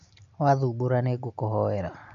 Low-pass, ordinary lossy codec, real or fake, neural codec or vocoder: 7.2 kHz; AAC, 64 kbps; real; none